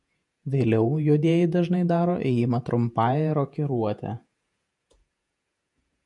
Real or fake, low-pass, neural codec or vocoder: fake; 10.8 kHz; vocoder, 48 kHz, 128 mel bands, Vocos